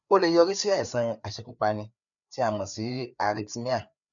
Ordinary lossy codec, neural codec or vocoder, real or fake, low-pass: none; codec, 16 kHz, 4 kbps, FreqCodec, larger model; fake; 7.2 kHz